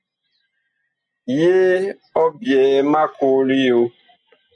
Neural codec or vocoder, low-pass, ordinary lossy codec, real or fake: none; 9.9 kHz; MP3, 64 kbps; real